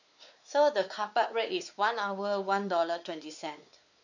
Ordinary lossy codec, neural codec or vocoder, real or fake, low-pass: none; codec, 16 kHz, 4 kbps, X-Codec, WavLM features, trained on Multilingual LibriSpeech; fake; 7.2 kHz